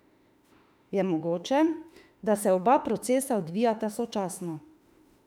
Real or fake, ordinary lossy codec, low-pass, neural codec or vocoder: fake; none; 19.8 kHz; autoencoder, 48 kHz, 32 numbers a frame, DAC-VAE, trained on Japanese speech